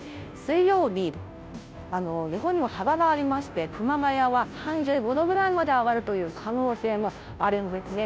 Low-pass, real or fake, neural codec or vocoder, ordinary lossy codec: none; fake; codec, 16 kHz, 0.5 kbps, FunCodec, trained on Chinese and English, 25 frames a second; none